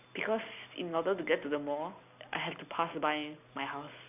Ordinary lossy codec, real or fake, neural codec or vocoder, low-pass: none; real; none; 3.6 kHz